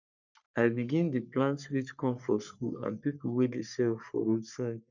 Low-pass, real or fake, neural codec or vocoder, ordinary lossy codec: 7.2 kHz; fake; codec, 44.1 kHz, 3.4 kbps, Pupu-Codec; none